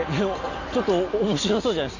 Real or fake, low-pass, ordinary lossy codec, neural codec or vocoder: real; 7.2 kHz; none; none